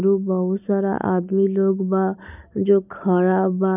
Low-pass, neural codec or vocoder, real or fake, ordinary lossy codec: 3.6 kHz; none; real; none